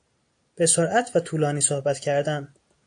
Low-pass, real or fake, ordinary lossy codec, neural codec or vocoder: 9.9 kHz; real; AAC, 48 kbps; none